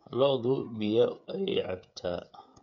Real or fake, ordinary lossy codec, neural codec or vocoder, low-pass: fake; none; codec, 16 kHz, 8 kbps, FreqCodec, smaller model; 7.2 kHz